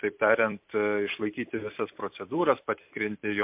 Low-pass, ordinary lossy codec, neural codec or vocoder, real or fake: 3.6 kHz; MP3, 32 kbps; vocoder, 44.1 kHz, 128 mel bands every 256 samples, BigVGAN v2; fake